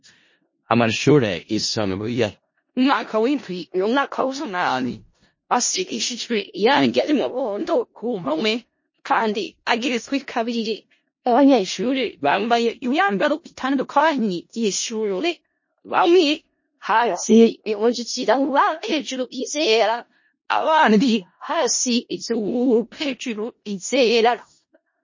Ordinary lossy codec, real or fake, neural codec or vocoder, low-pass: MP3, 32 kbps; fake; codec, 16 kHz in and 24 kHz out, 0.4 kbps, LongCat-Audio-Codec, four codebook decoder; 7.2 kHz